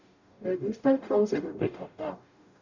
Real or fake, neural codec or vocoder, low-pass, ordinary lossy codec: fake; codec, 44.1 kHz, 0.9 kbps, DAC; 7.2 kHz; none